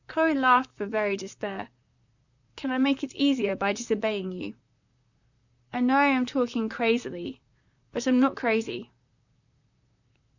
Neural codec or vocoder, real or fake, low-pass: vocoder, 44.1 kHz, 128 mel bands, Pupu-Vocoder; fake; 7.2 kHz